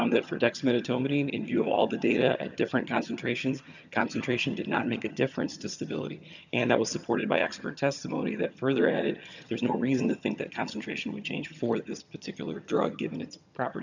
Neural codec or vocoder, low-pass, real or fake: vocoder, 22.05 kHz, 80 mel bands, HiFi-GAN; 7.2 kHz; fake